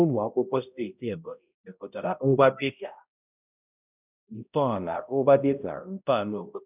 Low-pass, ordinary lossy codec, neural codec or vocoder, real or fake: 3.6 kHz; none; codec, 16 kHz, 0.5 kbps, X-Codec, HuBERT features, trained on balanced general audio; fake